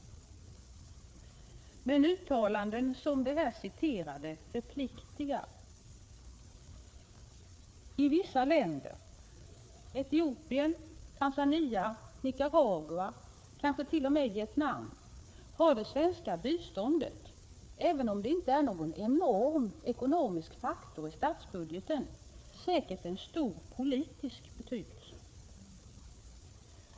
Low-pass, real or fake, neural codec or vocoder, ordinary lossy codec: none; fake; codec, 16 kHz, 4 kbps, FreqCodec, larger model; none